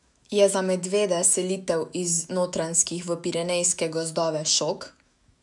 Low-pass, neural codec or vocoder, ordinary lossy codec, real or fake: 10.8 kHz; autoencoder, 48 kHz, 128 numbers a frame, DAC-VAE, trained on Japanese speech; none; fake